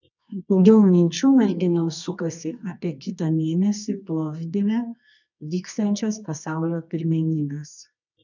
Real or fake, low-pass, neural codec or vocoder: fake; 7.2 kHz; codec, 24 kHz, 0.9 kbps, WavTokenizer, medium music audio release